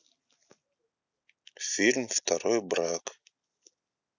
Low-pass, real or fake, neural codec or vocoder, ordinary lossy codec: 7.2 kHz; real; none; none